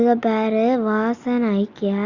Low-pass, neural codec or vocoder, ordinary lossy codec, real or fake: 7.2 kHz; none; Opus, 64 kbps; real